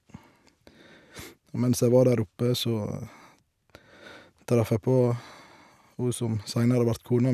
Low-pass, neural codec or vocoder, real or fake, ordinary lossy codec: 14.4 kHz; none; real; none